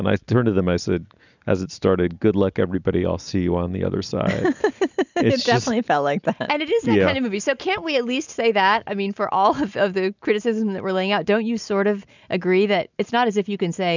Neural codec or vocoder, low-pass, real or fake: none; 7.2 kHz; real